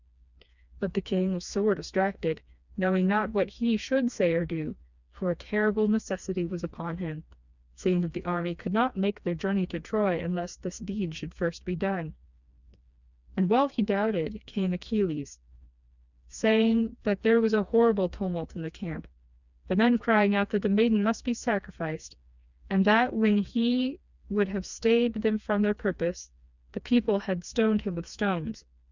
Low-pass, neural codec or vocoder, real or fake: 7.2 kHz; codec, 16 kHz, 2 kbps, FreqCodec, smaller model; fake